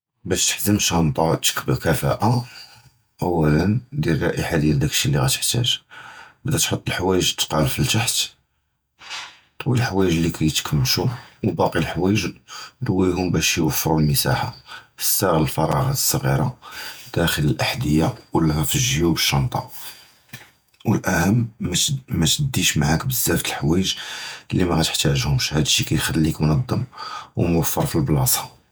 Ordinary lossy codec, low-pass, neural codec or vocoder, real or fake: none; none; vocoder, 48 kHz, 128 mel bands, Vocos; fake